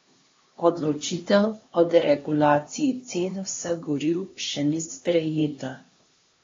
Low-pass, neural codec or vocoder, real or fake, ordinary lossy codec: 7.2 kHz; codec, 16 kHz, 2 kbps, X-Codec, HuBERT features, trained on LibriSpeech; fake; AAC, 32 kbps